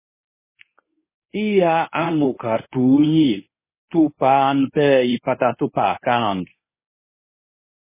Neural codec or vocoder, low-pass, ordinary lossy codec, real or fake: codec, 24 kHz, 0.9 kbps, WavTokenizer, medium speech release version 2; 3.6 kHz; MP3, 16 kbps; fake